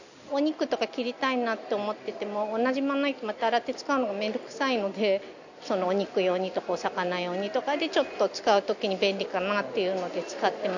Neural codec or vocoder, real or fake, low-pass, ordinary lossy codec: none; real; 7.2 kHz; none